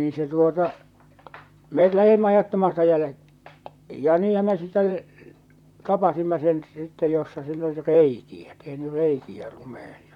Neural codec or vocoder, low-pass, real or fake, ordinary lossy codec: vocoder, 44.1 kHz, 128 mel bands, Pupu-Vocoder; 19.8 kHz; fake; none